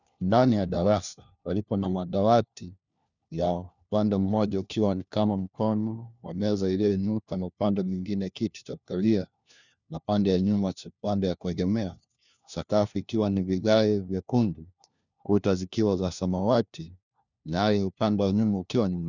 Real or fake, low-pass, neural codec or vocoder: fake; 7.2 kHz; codec, 16 kHz, 1 kbps, FunCodec, trained on LibriTTS, 50 frames a second